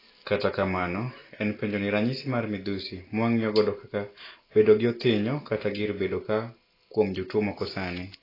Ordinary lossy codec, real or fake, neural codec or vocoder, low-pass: AAC, 24 kbps; real; none; 5.4 kHz